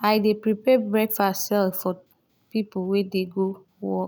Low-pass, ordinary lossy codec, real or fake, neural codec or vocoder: none; none; real; none